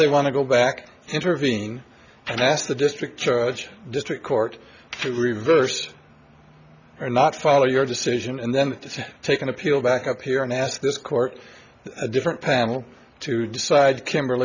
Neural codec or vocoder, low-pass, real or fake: none; 7.2 kHz; real